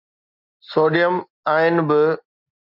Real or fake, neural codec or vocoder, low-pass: real; none; 5.4 kHz